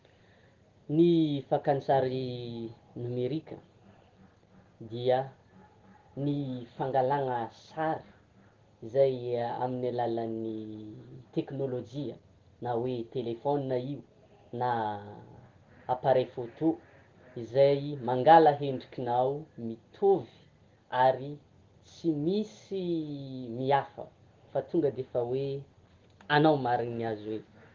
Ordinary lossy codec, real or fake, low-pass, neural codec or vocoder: Opus, 16 kbps; real; 7.2 kHz; none